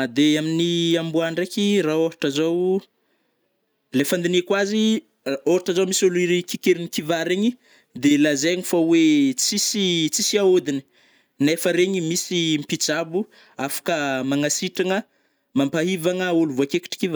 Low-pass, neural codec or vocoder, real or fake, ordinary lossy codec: none; none; real; none